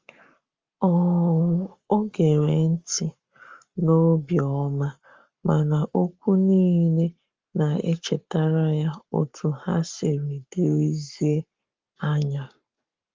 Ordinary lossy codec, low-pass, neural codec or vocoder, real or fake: Opus, 32 kbps; 7.2 kHz; codec, 44.1 kHz, 7.8 kbps, Pupu-Codec; fake